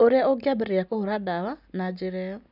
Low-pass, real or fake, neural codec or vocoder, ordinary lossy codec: 5.4 kHz; real; none; none